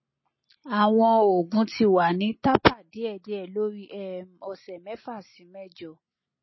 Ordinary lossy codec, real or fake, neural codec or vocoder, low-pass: MP3, 24 kbps; real; none; 7.2 kHz